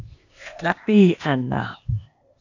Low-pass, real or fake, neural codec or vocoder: 7.2 kHz; fake; codec, 16 kHz, 0.8 kbps, ZipCodec